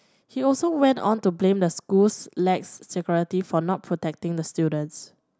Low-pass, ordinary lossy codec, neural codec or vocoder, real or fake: none; none; none; real